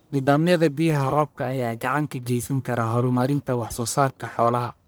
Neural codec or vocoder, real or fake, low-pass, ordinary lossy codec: codec, 44.1 kHz, 1.7 kbps, Pupu-Codec; fake; none; none